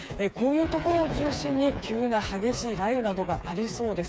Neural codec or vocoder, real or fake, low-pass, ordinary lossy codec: codec, 16 kHz, 4 kbps, FreqCodec, smaller model; fake; none; none